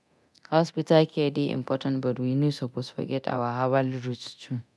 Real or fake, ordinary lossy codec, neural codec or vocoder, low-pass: fake; none; codec, 24 kHz, 0.9 kbps, DualCodec; none